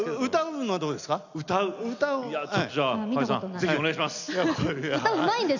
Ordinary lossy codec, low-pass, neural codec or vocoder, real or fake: none; 7.2 kHz; none; real